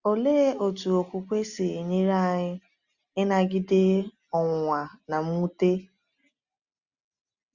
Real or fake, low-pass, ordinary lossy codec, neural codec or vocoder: real; 7.2 kHz; Opus, 64 kbps; none